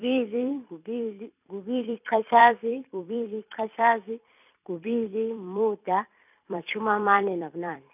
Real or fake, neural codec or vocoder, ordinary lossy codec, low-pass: fake; vocoder, 44.1 kHz, 128 mel bands every 256 samples, BigVGAN v2; none; 3.6 kHz